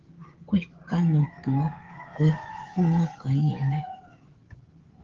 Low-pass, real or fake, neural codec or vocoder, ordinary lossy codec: 7.2 kHz; fake; codec, 16 kHz, 16 kbps, FreqCodec, smaller model; Opus, 16 kbps